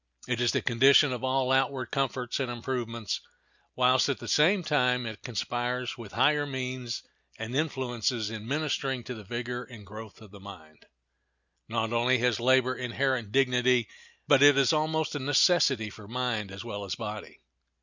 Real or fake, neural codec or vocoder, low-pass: real; none; 7.2 kHz